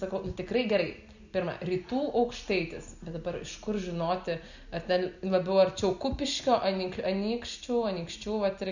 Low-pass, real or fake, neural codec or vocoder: 7.2 kHz; real; none